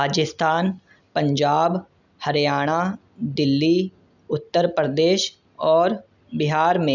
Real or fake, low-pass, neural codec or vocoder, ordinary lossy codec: real; 7.2 kHz; none; none